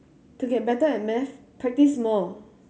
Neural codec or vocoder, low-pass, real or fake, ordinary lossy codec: none; none; real; none